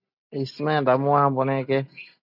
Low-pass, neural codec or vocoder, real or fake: 5.4 kHz; none; real